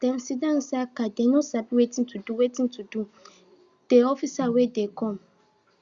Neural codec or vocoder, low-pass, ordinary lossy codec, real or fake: none; 7.2 kHz; Opus, 64 kbps; real